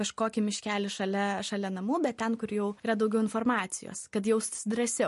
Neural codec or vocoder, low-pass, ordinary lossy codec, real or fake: none; 14.4 kHz; MP3, 48 kbps; real